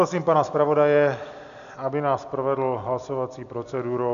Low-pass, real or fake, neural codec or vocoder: 7.2 kHz; real; none